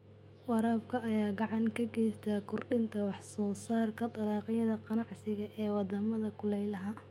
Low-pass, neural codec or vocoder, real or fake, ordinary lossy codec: 19.8 kHz; autoencoder, 48 kHz, 128 numbers a frame, DAC-VAE, trained on Japanese speech; fake; MP3, 64 kbps